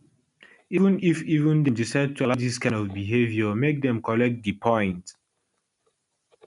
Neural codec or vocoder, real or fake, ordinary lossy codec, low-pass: none; real; none; 10.8 kHz